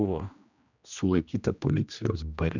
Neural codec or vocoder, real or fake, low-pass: codec, 16 kHz, 1 kbps, X-Codec, HuBERT features, trained on general audio; fake; 7.2 kHz